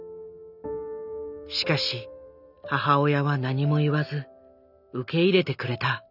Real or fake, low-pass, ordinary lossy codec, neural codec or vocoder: real; 5.4 kHz; none; none